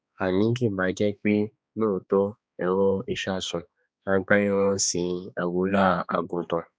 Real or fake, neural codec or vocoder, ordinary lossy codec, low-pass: fake; codec, 16 kHz, 2 kbps, X-Codec, HuBERT features, trained on balanced general audio; none; none